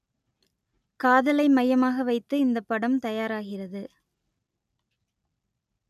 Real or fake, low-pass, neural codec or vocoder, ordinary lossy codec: real; 14.4 kHz; none; none